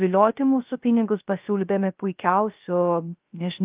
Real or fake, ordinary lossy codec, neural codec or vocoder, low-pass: fake; Opus, 24 kbps; codec, 16 kHz, 0.3 kbps, FocalCodec; 3.6 kHz